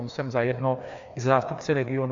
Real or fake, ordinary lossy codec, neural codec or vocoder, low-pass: fake; AAC, 64 kbps; codec, 16 kHz, 2 kbps, FreqCodec, larger model; 7.2 kHz